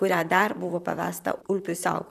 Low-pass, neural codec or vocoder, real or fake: 14.4 kHz; vocoder, 44.1 kHz, 128 mel bands, Pupu-Vocoder; fake